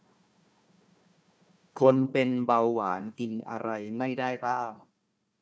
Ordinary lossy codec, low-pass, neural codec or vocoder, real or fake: none; none; codec, 16 kHz, 1 kbps, FunCodec, trained on Chinese and English, 50 frames a second; fake